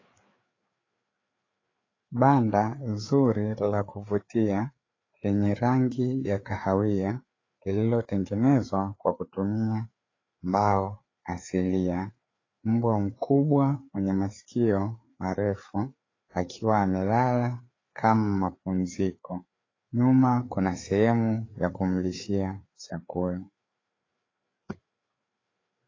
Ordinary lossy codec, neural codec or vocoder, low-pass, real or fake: AAC, 32 kbps; codec, 16 kHz, 4 kbps, FreqCodec, larger model; 7.2 kHz; fake